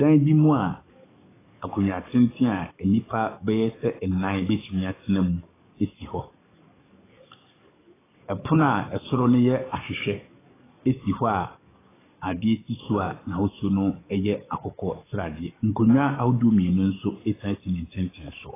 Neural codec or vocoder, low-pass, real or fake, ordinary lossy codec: codec, 44.1 kHz, 7.8 kbps, DAC; 3.6 kHz; fake; AAC, 16 kbps